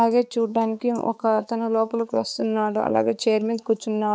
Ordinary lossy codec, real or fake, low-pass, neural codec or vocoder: none; fake; none; codec, 16 kHz, 4 kbps, X-Codec, HuBERT features, trained on balanced general audio